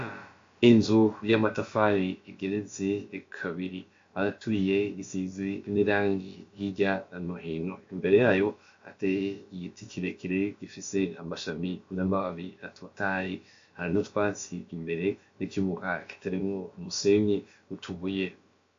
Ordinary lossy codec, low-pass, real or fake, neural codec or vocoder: AAC, 48 kbps; 7.2 kHz; fake; codec, 16 kHz, about 1 kbps, DyCAST, with the encoder's durations